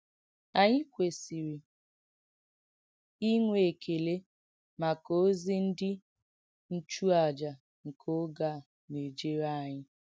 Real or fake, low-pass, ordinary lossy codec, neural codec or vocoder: real; none; none; none